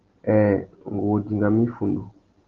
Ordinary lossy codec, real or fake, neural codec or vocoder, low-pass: Opus, 16 kbps; real; none; 7.2 kHz